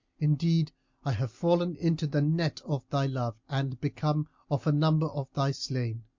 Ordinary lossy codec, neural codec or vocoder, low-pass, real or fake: MP3, 48 kbps; none; 7.2 kHz; real